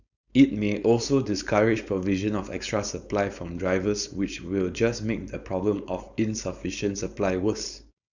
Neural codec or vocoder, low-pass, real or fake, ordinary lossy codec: codec, 16 kHz, 4.8 kbps, FACodec; 7.2 kHz; fake; none